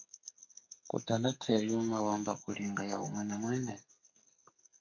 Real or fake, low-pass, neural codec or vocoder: fake; 7.2 kHz; codec, 44.1 kHz, 2.6 kbps, SNAC